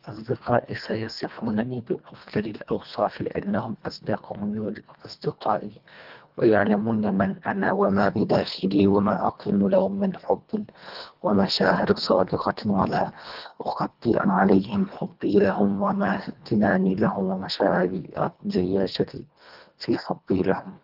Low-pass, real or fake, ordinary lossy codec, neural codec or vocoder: 5.4 kHz; fake; Opus, 32 kbps; codec, 24 kHz, 1.5 kbps, HILCodec